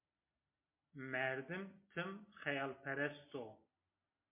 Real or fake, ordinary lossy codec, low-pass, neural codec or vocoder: real; MP3, 24 kbps; 3.6 kHz; none